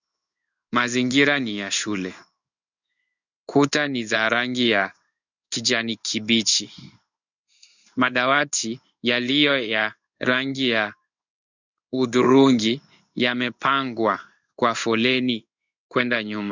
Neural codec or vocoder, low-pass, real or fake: codec, 16 kHz in and 24 kHz out, 1 kbps, XY-Tokenizer; 7.2 kHz; fake